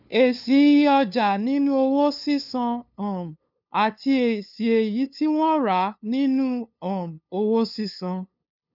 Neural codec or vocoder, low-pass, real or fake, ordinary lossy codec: codec, 16 kHz, 2 kbps, FunCodec, trained on LibriTTS, 25 frames a second; 5.4 kHz; fake; none